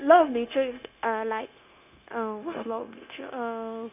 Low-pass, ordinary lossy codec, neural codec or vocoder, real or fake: 3.6 kHz; none; codec, 16 kHz, 0.9 kbps, LongCat-Audio-Codec; fake